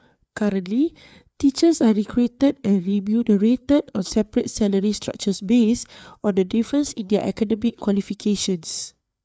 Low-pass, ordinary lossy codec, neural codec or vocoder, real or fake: none; none; codec, 16 kHz, 4 kbps, FreqCodec, larger model; fake